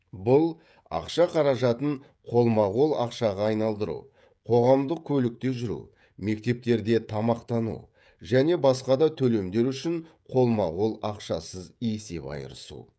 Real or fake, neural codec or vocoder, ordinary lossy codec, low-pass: fake; codec, 16 kHz, 16 kbps, FreqCodec, smaller model; none; none